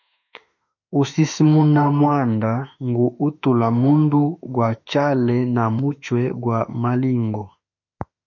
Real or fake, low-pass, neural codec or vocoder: fake; 7.2 kHz; autoencoder, 48 kHz, 32 numbers a frame, DAC-VAE, trained on Japanese speech